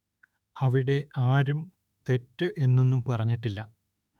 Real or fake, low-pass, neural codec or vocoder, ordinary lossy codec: fake; 19.8 kHz; autoencoder, 48 kHz, 32 numbers a frame, DAC-VAE, trained on Japanese speech; none